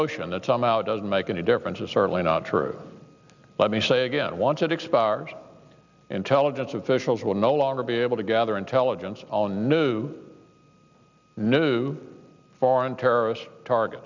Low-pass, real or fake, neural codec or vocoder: 7.2 kHz; real; none